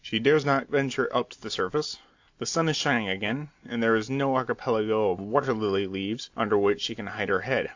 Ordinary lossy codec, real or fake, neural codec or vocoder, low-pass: AAC, 48 kbps; real; none; 7.2 kHz